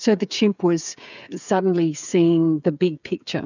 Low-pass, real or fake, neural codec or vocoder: 7.2 kHz; fake; codec, 16 kHz, 8 kbps, FreqCodec, smaller model